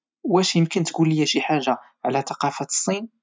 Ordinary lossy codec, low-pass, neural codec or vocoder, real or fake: none; none; none; real